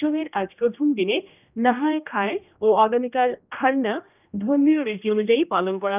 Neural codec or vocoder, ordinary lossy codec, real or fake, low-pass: codec, 16 kHz, 1 kbps, X-Codec, HuBERT features, trained on general audio; none; fake; 3.6 kHz